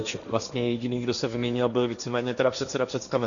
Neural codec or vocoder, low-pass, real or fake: codec, 16 kHz, 1.1 kbps, Voila-Tokenizer; 7.2 kHz; fake